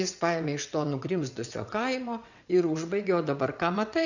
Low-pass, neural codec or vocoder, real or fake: 7.2 kHz; vocoder, 22.05 kHz, 80 mel bands, WaveNeXt; fake